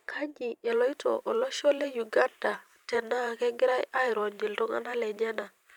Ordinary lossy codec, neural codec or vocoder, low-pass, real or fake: none; vocoder, 44.1 kHz, 128 mel bands every 512 samples, BigVGAN v2; 19.8 kHz; fake